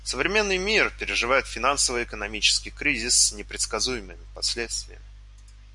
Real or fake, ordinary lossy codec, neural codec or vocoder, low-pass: real; MP3, 96 kbps; none; 10.8 kHz